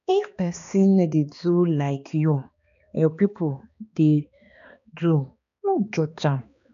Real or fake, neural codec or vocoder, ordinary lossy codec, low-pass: fake; codec, 16 kHz, 2 kbps, X-Codec, HuBERT features, trained on balanced general audio; none; 7.2 kHz